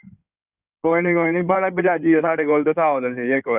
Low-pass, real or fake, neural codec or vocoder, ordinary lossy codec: 3.6 kHz; fake; codec, 16 kHz in and 24 kHz out, 2.2 kbps, FireRedTTS-2 codec; none